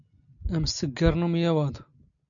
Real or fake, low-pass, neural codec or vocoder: real; 7.2 kHz; none